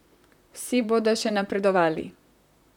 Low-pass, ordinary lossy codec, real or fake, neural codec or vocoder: 19.8 kHz; none; fake; vocoder, 44.1 kHz, 128 mel bands, Pupu-Vocoder